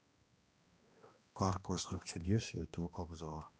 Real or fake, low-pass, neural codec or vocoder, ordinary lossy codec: fake; none; codec, 16 kHz, 1 kbps, X-Codec, HuBERT features, trained on balanced general audio; none